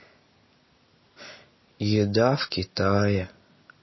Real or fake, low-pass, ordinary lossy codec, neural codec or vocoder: real; 7.2 kHz; MP3, 24 kbps; none